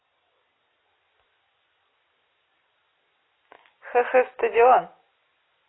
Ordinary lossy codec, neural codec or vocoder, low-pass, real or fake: AAC, 16 kbps; none; 7.2 kHz; real